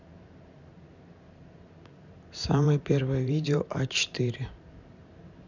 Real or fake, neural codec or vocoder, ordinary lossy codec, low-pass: fake; vocoder, 44.1 kHz, 128 mel bands every 512 samples, BigVGAN v2; none; 7.2 kHz